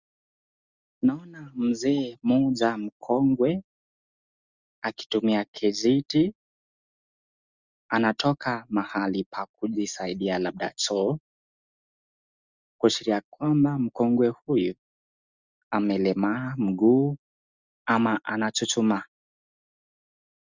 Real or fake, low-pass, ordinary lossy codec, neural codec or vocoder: real; 7.2 kHz; Opus, 64 kbps; none